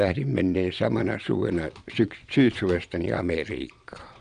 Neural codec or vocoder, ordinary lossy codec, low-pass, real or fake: vocoder, 22.05 kHz, 80 mel bands, Vocos; none; 9.9 kHz; fake